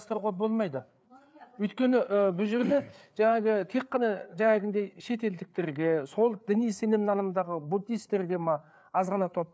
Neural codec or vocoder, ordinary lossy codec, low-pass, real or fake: codec, 16 kHz, 4 kbps, FreqCodec, larger model; none; none; fake